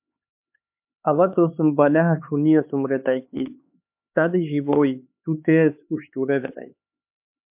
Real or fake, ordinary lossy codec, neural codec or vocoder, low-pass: fake; MP3, 32 kbps; codec, 16 kHz, 4 kbps, X-Codec, HuBERT features, trained on LibriSpeech; 3.6 kHz